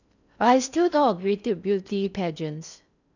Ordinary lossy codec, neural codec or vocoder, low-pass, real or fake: none; codec, 16 kHz in and 24 kHz out, 0.6 kbps, FocalCodec, streaming, 4096 codes; 7.2 kHz; fake